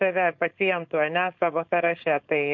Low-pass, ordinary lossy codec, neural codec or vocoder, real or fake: 7.2 kHz; MP3, 48 kbps; codec, 16 kHz, 4.8 kbps, FACodec; fake